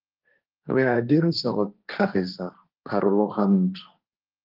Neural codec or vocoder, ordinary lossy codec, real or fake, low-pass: codec, 16 kHz, 1.1 kbps, Voila-Tokenizer; Opus, 32 kbps; fake; 5.4 kHz